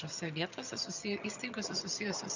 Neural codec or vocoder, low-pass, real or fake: vocoder, 22.05 kHz, 80 mel bands, HiFi-GAN; 7.2 kHz; fake